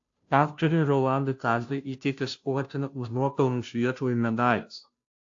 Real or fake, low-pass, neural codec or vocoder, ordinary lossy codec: fake; 7.2 kHz; codec, 16 kHz, 0.5 kbps, FunCodec, trained on Chinese and English, 25 frames a second; AAC, 48 kbps